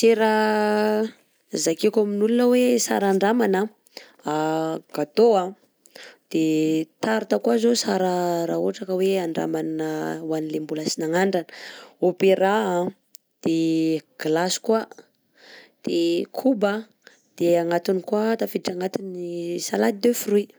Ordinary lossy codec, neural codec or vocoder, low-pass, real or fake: none; none; none; real